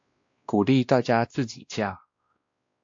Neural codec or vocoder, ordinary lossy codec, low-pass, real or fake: codec, 16 kHz, 1 kbps, X-Codec, WavLM features, trained on Multilingual LibriSpeech; AAC, 48 kbps; 7.2 kHz; fake